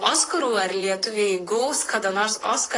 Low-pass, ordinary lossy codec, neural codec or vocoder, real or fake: 10.8 kHz; AAC, 32 kbps; vocoder, 44.1 kHz, 128 mel bands, Pupu-Vocoder; fake